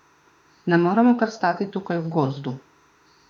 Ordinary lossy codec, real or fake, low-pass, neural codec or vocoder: none; fake; 19.8 kHz; autoencoder, 48 kHz, 32 numbers a frame, DAC-VAE, trained on Japanese speech